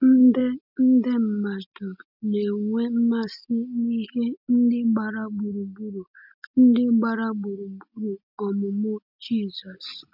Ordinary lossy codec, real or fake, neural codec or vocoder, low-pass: none; real; none; 5.4 kHz